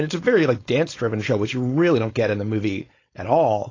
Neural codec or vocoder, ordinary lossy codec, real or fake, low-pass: codec, 16 kHz, 4.8 kbps, FACodec; AAC, 32 kbps; fake; 7.2 kHz